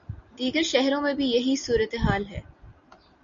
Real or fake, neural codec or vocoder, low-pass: real; none; 7.2 kHz